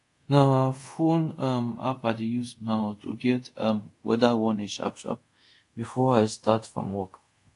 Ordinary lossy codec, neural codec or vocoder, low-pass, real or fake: AAC, 48 kbps; codec, 24 kHz, 0.5 kbps, DualCodec; 10.8 kHz; fake